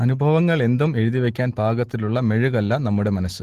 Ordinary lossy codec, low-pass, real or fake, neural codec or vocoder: Opus, 16 kbps; 14.4 kHz; real; none